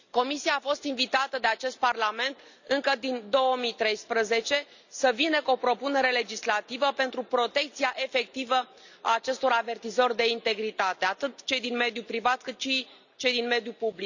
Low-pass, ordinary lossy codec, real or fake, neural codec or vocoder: 7.2 kHz; none; real; none